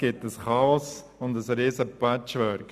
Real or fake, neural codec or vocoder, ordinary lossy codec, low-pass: real; none; none; 14.4 kHz